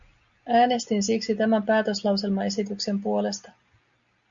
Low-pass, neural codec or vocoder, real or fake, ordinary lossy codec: 7.2 kHz; none; real; Opus, 64 kbps